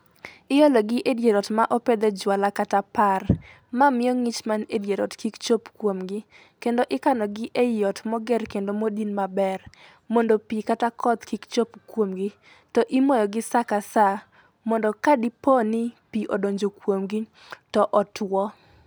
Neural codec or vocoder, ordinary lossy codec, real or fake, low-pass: none; none; real; none